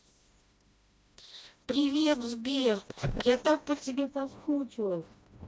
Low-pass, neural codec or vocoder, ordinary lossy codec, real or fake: none; codec, 16 kHz, 1 kbps, FreqCodec, smaller model; none; fake